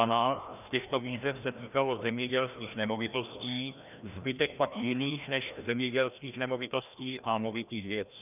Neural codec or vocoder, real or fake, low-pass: codec, 16 kHz, 1 kbps, FreqCodec, larger model; fake; 3.6 kHz